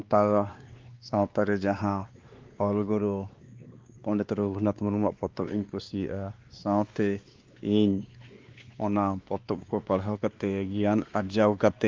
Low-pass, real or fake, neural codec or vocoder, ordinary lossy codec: 7.2 kHz; fake; codec, 16 kHz, 2 kbps, X-Codec, WavLM features, trained on Multilingual LibriSpeech; Opus, 32 kbps